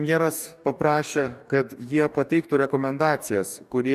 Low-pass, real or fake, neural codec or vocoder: 14.4 kHz; fake; codec, 44.1 kHz, 2.6 kbps, DAC